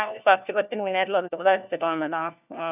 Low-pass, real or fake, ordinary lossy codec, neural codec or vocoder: 3.6 kHz; fake; none; codec, 16 kHz, 1 kbps, FunCodec, trained on LibriTTS, 50 frames a second